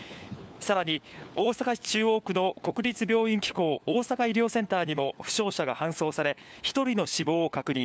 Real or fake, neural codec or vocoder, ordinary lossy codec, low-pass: fake; codec, 16 kHz, 4 kbps, FunCodec, trained on LibriTTS, 50 frames a second; none; none